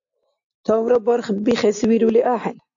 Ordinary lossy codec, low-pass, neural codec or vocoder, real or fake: AAC, 64 kbps; 7.2 kHz; none; real